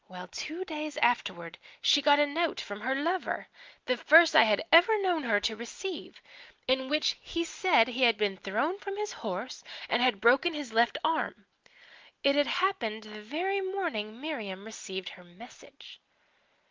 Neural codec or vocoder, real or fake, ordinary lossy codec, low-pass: none; real; Opus, 24 kbps; 7.2 kHz